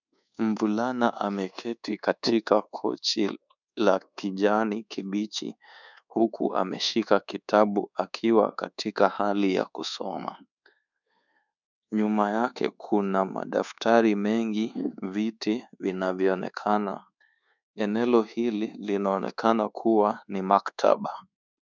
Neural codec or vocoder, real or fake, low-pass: codec, 24 kHz, 1.2 kbps, DualCodec; fake; 7.2 kHz